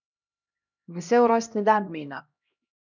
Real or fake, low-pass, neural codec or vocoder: fake; 7.2 kHz; codec, 16 kHz, 1 kbps, X-Codec, HuBERT features, trained on LibriSpeech